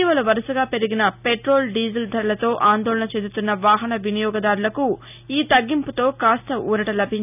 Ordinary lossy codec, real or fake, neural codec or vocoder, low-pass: none; real; none; 3.6 kHz